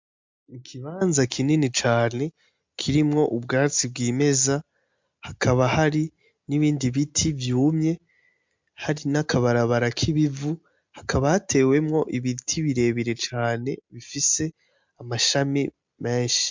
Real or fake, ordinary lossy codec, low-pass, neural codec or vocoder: real; MP3, 64 kbps; 7.2 kHz; none